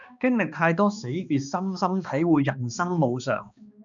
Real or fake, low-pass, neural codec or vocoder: fake; 7.2 kHz; codec, 16 kHz, 2 kbps, X-Codec, HuBERT features, trained on balanced general audio